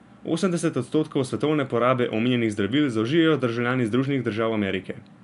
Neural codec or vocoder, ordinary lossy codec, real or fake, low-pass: none; none; real; 10.8 kHz